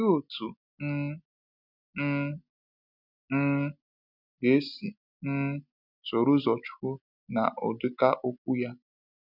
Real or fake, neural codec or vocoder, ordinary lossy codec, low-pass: real; none; none; 5.4 kHz